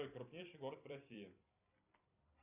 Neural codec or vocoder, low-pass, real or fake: vocoder, 44.1 kHz, 128 mel bands every 256 samples, BigVGAN v2; 3.6 kHz; fake